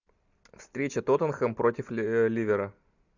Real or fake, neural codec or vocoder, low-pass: real; none; 7.2 kHz